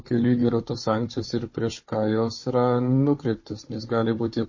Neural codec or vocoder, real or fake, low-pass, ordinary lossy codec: codec, 16 kHz, 4 kbps, FunCodec, trained on Chinese and English, 50 frames a second; fake; 7.2 kHz; MP3, 32 kbps